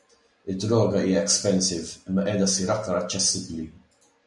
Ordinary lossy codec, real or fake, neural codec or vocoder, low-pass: MP3, 96 kbps; real; none; 10.8 kHz